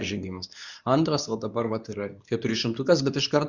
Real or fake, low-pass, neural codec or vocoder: fake; 7.2 kHz; codec, 24 kHz, 0.9 kbps, WavTokenizer, medium speech release version 2